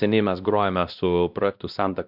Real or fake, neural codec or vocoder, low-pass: fake; codec, 16 kHz, 1 kbps, X-Codec, WavLM features, trained on Multilingual LibriSpeech; 5.4 kHz